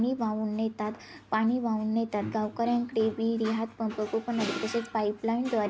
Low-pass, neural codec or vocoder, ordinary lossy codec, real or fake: none; none; none; real